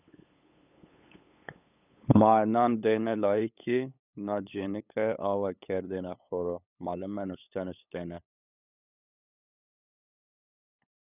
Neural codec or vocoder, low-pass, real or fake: codec, 16 kHz, 8 kbps, FunCodec, trained on LibriTTS, 25 frames a second; 3.6 kHz; fake